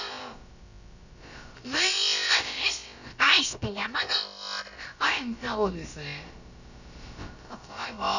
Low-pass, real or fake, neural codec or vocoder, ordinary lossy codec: 7.2 kHz; fake; codec, 16 kHz, about 1 kbps, DyCAST, with the encoder's durations; none